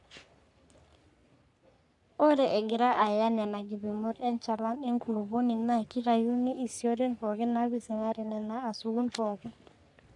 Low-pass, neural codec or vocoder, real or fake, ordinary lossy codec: 10.8 kHz; codec, 44.1 kHz, 3.4 kbps, Pupu-Codec; fake; none